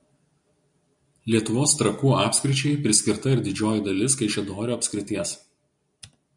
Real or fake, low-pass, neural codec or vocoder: real; 10.8 kHz; none